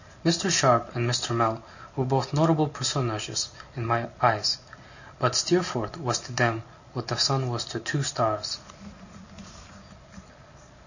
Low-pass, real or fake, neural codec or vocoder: 7.2 kHz; real; none